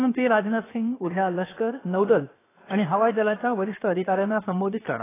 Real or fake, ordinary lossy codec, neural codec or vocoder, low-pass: fake; AAC, 16 kbps; codec, 16 kHz, 0.7 kbps, FocalCodec; 3.6 kHz